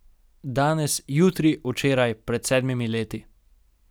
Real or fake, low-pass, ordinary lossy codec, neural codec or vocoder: real; none; none; none